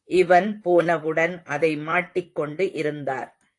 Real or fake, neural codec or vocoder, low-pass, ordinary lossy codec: fake; vocoder, 44.1 kHz, 128 mel bands, Pupu-Vocoder; 10.8 kHz; AAC, 48 kbps